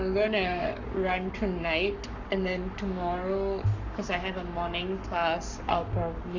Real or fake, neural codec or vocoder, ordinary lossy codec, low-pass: fake; codec, 44.1 kHz, 7.8 kbps, Pupu-Codec; none; 7.2 kHz